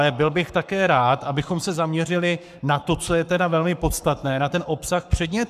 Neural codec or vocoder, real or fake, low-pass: codec, 44.1 kHz, 7.8 kbps, Pupu-Codec; fake; 14.4 kHz